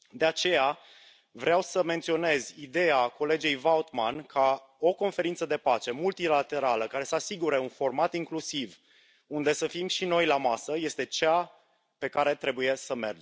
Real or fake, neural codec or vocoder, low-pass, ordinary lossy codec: real; none; none; none